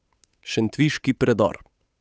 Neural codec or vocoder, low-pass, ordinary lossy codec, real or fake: codec, 16 kHz, 8 kbps, FunCodec, trained on Chinese and English, 25 frames a second; none; none; fake